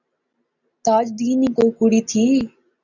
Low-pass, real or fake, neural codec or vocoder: 7.2 kHz; real; none